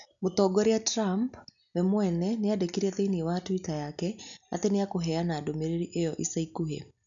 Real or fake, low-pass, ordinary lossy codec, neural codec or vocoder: real; 7.2 kHz; none; none